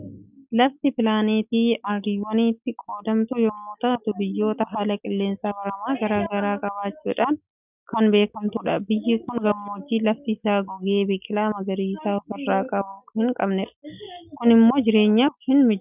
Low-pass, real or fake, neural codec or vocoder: 3.6 kHz; real; none